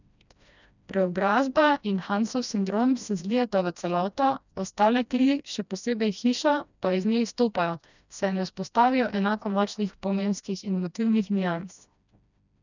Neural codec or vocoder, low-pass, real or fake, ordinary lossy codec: codec, 16 kHz, 1 kbps, FreqCodec, smaller model; 7.2 kHz; fake; none